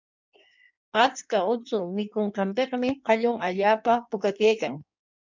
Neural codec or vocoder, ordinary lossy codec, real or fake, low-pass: codec, 16 kHz in and 24 kHz out, 1.1 kbps, FireRedTTS-2 codec; MP3, 64 kbps; fake; 7.2 kHz